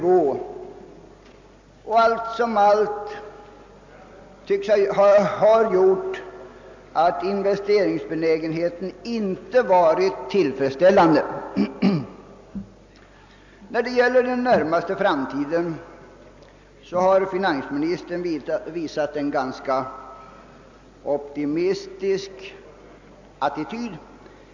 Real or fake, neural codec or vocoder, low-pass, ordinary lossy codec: real; none; 7.2 kHz; MP3, 64 kbps